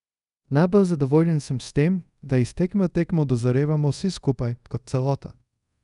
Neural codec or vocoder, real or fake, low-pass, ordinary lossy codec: codec, 24 kHz, 0.5 kbps, DualCodec; fake; 10.8 kHz; none